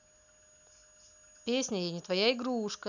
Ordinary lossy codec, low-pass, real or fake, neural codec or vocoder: none; 7.2 kHz; real; none